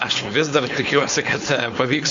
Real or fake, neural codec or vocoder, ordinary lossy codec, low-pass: fake; codec, 16 kHz, 4.8 kbps, FACodec; AAC, 64 kbps; 7.2 kHz